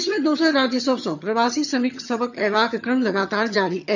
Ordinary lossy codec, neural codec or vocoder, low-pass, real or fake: none; vocoder, 22.05 kHz, 80 mel bands, HiFi-GAN; 7.2 kHz; fake